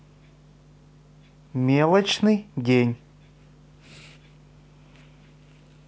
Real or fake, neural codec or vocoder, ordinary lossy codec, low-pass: real; none; none; none